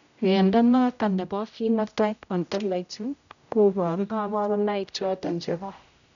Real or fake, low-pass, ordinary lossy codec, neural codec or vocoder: fake; 7.2 kHz; none; codec, 16 kHz, 0.5 kbps, X-Codec, HuBERT features, trained on general audio